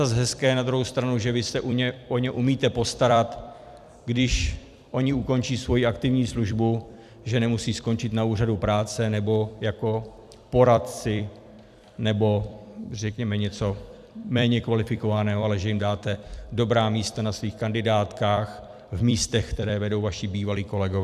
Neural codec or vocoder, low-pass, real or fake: vocoder, 44.1 kHz, 128 mel bands every 256 samples, BigVGAN v2; 14.4 kHz; fake